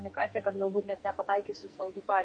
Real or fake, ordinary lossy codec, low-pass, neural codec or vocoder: fake; MP3, 48 kbps; 9.9 kHz; codec, 44.1 kHz, 2.6 kbps, SNAC